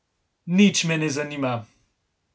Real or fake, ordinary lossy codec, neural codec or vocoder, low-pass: real; none; none; none